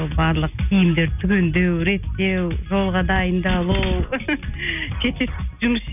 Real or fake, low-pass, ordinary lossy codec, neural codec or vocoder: real; 3.6 kHz; none; none